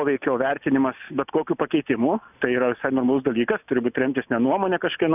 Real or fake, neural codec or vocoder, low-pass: real; none; 3.6 kHz